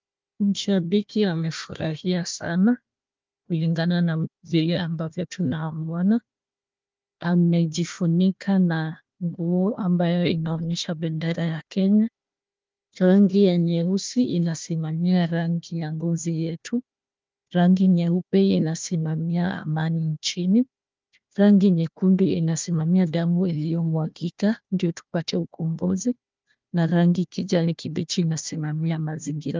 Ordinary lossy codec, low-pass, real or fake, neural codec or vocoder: Opus, 24 kbps; 7.2 kHz; fake; codec, 16 kHz, 1 kbps, FunCodec, trained on Chinese and English, 50 frames a second